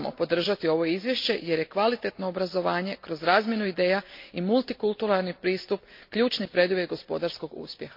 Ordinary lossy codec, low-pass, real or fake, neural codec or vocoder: MP3, 32 kbps; 5.4 kHz; real; none